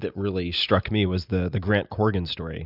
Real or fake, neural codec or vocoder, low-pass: real; none; 5.4 kHz